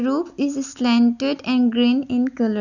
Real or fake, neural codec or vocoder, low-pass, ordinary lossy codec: real; none; 7.2 kHz; none